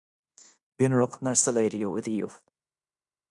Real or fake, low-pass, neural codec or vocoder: fake; 10.8 kHz; codec, 16 kHz in and 24 kHz out, 0.9 kbps, LongCat-Audio-Codec, fine tuned four codebook decoder